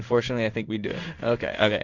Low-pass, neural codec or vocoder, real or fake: 7.2 kHz; codec, 16 kHz in and 24 kHz out, 1 kbps, XY-Tokenizer; fake